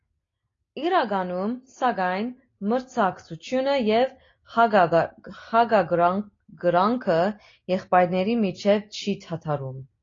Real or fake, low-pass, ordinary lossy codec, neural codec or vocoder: real; 7.2 kHz; AAC, 32 kbps; none